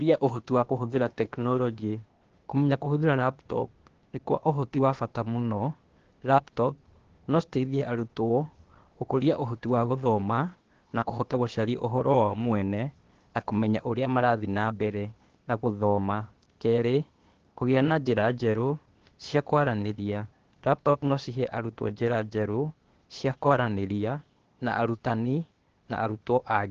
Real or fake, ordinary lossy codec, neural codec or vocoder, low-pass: fake; Opus, 16 kbps; codec, 16 kHz, 0.8 kbps, ZipCodec; 7.2 kHz